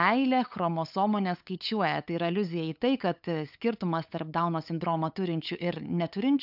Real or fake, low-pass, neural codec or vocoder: fake; 5.4 kHz; codec, 16 kHz, 8 kbps, FunCodec, trained on LibriTTS, 25 frames a second